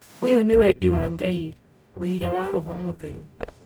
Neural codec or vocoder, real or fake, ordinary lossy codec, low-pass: codec, 44.1 kHz, 0.9 kbps, DAC; fake; none; none